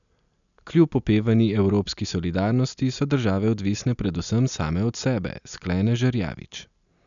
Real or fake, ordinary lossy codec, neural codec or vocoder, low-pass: real; none; none; 7.2 kHz